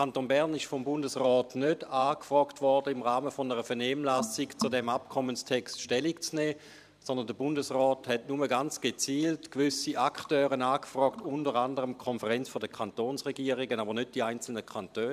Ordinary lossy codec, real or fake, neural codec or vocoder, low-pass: none; fake; vocoder, 44.1 kHz, 128 mel bands every 512 samples, BigVGAN v2; 14.4 kHz